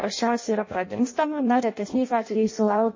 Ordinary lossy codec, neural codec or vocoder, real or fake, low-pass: MP3, 32 kbps; codec, 16 kHz in and 24 kHz out, 0.6 kbps, FireRedTTS-2 codec; fake; 7.2 kHz